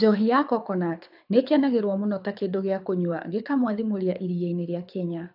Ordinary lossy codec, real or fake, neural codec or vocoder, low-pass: AAC, 48 kbps; fake; codec, 24 kHz, 6 kbps, HILCodec; 5.4 kHz